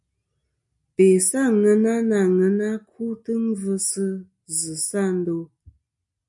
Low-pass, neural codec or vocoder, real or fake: 10.8 kHz; none; real